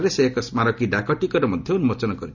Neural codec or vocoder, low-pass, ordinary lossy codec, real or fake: none; 7.2 kHz; none; real